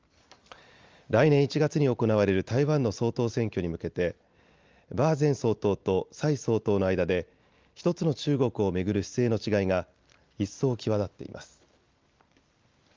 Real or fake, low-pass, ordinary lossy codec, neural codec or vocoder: real; 7.2 kHz; Opus, 32 kbps; none